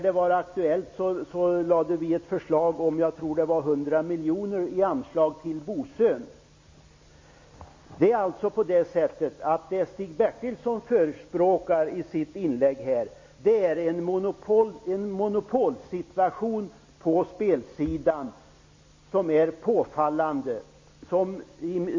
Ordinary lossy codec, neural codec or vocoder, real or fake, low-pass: MP3, 32 kbps; none; real; 7.2 kHz